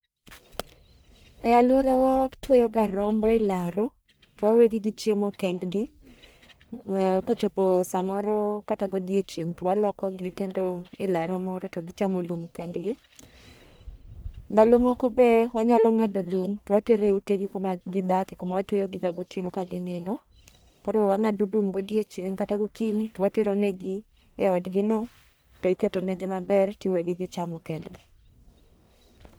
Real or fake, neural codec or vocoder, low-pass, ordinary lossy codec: fake; codec, 44.1 kHz, 1.7 kbps, Pupu-Codec; none; none